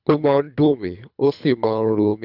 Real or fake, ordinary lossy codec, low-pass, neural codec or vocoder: fake; none; 5.4 kHz; codec, 24 kHz, 3 kbps, HILCodec